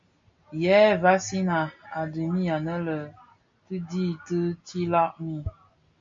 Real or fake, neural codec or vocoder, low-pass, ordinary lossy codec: real; none; 7.2 kHz; MP3, 48 kbps